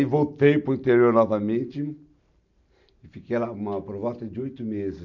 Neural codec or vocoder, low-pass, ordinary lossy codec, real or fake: none; 7.2 kHz; none; real